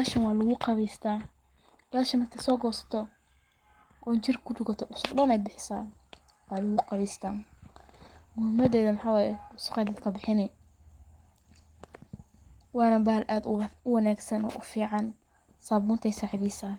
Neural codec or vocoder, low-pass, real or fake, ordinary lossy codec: codec, 44.1 kHz, 7.8 kbps, Pupu-Codec; 19.8 kHz; fake; Opus, 24 kbps